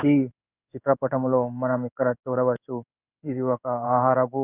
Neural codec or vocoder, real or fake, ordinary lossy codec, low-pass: codec, 16 kHz in and 24 kHz out, 1 kbps, XY-Tokenizer; fake; none; 3.6 kHz